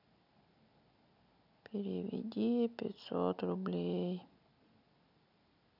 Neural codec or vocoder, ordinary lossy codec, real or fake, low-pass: none; none; real; 5.4 kHz